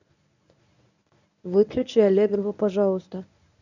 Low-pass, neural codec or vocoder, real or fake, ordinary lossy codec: 7.2 kHz; codec, 24 kHz, 0.9 kbps, WavTokenizer, medium speech release version 1; fake; none